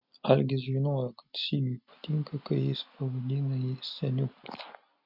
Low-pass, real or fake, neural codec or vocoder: 5.4 kHz; real; none